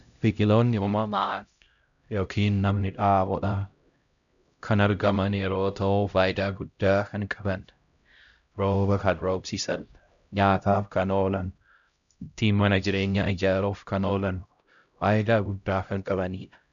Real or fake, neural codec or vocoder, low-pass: fake; codec, 16 kHz, 0.5 kbps, X-Codec, HuBERT features, trained on LibriSpeech; 7.2 kHz